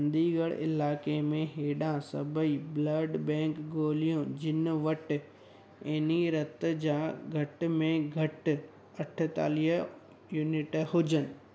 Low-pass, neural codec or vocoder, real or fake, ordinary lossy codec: none; none; real; none